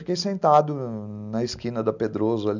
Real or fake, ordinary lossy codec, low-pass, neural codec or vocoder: real; none; 7.2 kHz; none